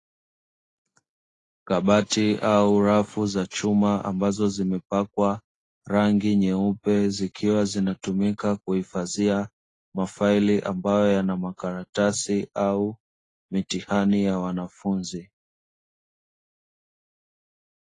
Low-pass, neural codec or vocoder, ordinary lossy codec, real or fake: 10.8 kHz; none; AAC, 32 kbps; real